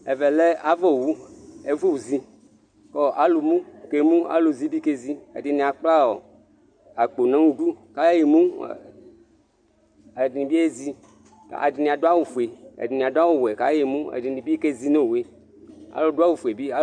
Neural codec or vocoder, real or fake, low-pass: none; real; 9.9 kHz